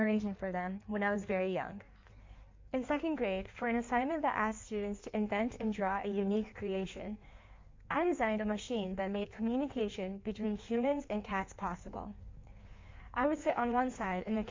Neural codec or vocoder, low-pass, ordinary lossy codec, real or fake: codec, 16 kHz in and 24 kHz out, 1.1 kbps, FireRedTTS-2 codec; 7.2 kHz; MP3, 48 kbps; fake